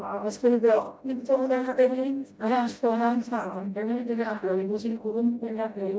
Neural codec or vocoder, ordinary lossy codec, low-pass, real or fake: codec, 16 kHz, 0.5 kbps, FreqCodec, smaller model; none; none; fake